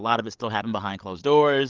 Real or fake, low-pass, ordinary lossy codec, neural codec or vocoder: fake; 7.2 kHz; Opus, 32 kbps; codec, 16 kHz, 16 kbps, FreqCodec, larger model